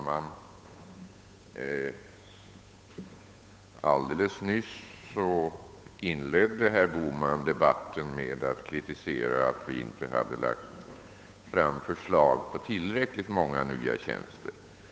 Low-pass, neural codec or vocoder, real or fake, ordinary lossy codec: none; codec, 16 kHz, 8 kbps, FunCodec, trained on Chinese and English, 25 frames a second; fake; none